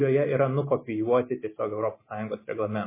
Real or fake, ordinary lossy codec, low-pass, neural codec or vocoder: real; MP3, 16 kbps; 3.6 kHz; none